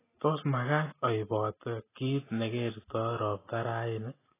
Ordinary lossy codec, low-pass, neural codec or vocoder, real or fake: AAC, 16 kbps; 3.6 kHz; none; real